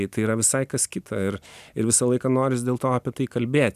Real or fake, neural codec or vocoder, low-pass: real; none; 14.4 kHz